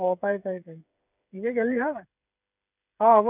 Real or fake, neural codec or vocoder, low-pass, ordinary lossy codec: fake; codec, 16 kHz, 8 kbps, FreqCodec, smaller model; 3.6 kHz; none